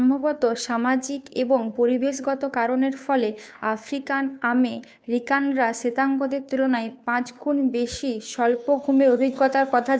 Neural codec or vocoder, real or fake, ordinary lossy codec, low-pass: codec, 16 kHz, 2 kbps, FunCodec, trained on Chinese and English, 25 frames a second; fake; none; none